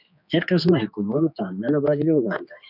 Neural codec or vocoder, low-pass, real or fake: codec, 16 kHz, 2 kbps, X-Codec, HuBERT features, trained on general audio; 5.4 kHz; fake